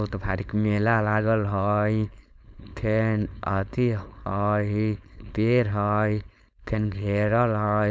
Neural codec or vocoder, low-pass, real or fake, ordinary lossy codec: codec, 16 kHz, 4.8 kbps, FACodec; none; fake; none